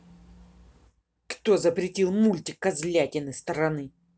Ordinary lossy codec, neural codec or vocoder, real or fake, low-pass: none; none; real; none